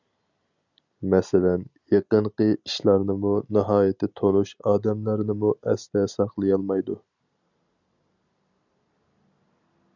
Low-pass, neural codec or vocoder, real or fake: 7.2 kHz; none; real